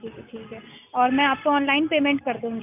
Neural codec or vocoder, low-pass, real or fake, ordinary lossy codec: none; 3.6 kHz; real; none